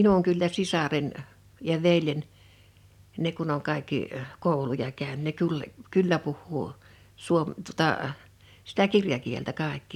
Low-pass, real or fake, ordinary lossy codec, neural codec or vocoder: 19.8 kHz; real; none; none